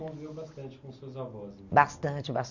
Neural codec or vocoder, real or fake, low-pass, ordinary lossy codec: none; real; 7.2 kHz; none